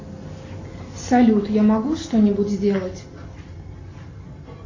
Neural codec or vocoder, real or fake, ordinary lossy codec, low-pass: none; real; AAC, 48 kbps; 7.2 kHz